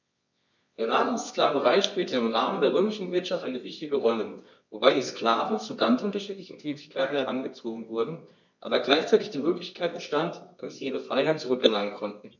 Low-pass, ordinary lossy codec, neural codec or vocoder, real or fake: 7.2 kHz; none; codec, 24 kHz, 0.9 kbps, WavTokenizer, medium music audio release; fake